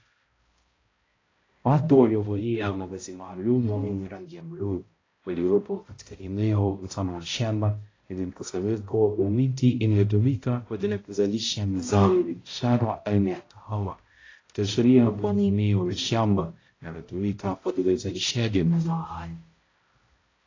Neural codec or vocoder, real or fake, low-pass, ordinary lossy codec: codec, 16 kHz, 0.5 kbps, X-Codec, HuBERT features, trained on balanced general audio; fake; 7.2 kHz; AAC, 32 kbps